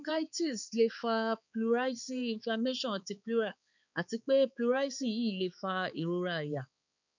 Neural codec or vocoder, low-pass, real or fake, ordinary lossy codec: codec, 16 kHz, 4 kbps, X-Codec, HuBERT features, trained on balanced general audio; 7.2 kHz; fake; none